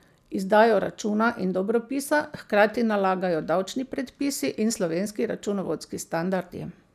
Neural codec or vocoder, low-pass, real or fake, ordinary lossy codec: none; 14.4 kHz; real; none